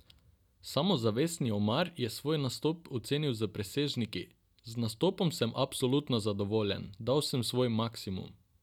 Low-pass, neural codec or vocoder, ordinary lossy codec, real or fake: 19.8 kHz; none; none; real